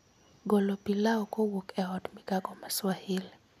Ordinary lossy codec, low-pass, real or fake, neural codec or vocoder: none; 14.4 kHz; real; none